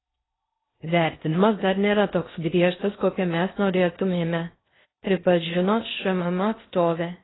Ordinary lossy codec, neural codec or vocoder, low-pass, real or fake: AAC, 16 kbps; codec, 16 kHz in and 24 kHz out, 0.6 kbps, FocalCodec, streaming, 4096 codes; 7.2 kHz; fake